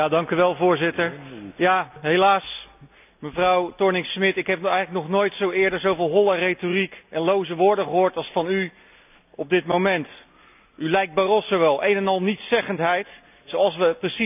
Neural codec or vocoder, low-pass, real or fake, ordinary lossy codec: none; 3.6 kHz; real; none